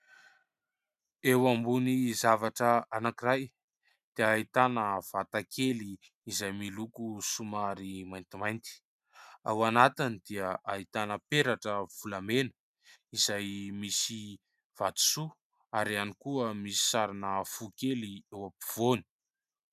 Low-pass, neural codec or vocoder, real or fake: 14.4 kHz; none; real